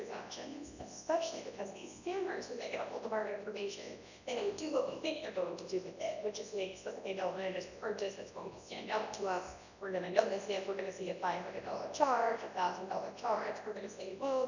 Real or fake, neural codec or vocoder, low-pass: fake; codec, 24 kHz, 0.9 kbps, WavTokenizer, large speech release; 7.2 kHz